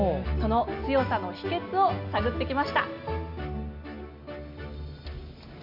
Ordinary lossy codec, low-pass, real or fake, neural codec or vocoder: none; 5.4 kHz; real; none